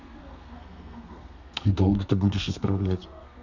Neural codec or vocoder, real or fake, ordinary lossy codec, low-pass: codec, 32 kHz, 1.9 kbps, SNAC; fake; none; 7.2 kHz